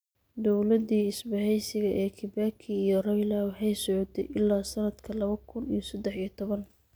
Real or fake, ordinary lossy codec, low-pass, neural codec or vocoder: real; none; none; none